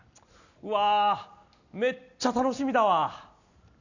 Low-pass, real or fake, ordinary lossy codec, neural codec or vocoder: 7.2 kHz; real; none; none